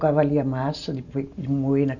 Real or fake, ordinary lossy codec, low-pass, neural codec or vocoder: real; none; 7.2 kHz; none